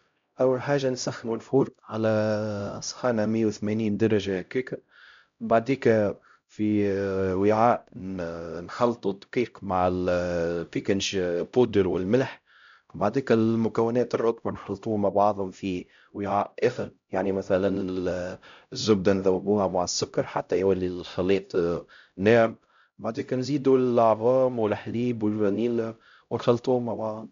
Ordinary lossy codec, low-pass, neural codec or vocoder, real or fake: MP3, 64 kbps; 7.2 kHz; codec, 16 kHz, 0.5 kbps, X-Codec, HuBERT features, trained on LibriSpeech; fake